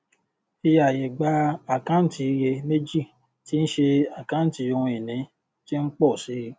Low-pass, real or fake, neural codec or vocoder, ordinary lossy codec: none; real; none; none